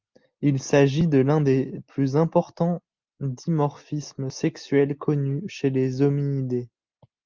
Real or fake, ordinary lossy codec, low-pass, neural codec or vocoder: real; Opus, 32 kbps; 7.2 kHz; none